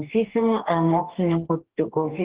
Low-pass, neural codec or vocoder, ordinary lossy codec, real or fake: 3.6 kHz; codec, 44.1 kHz, 2.6 kbps, DAC; Opus, 16 kbps; fake